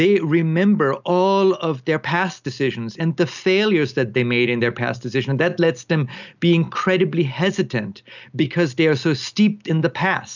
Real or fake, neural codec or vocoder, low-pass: real; none; 7.2 kHz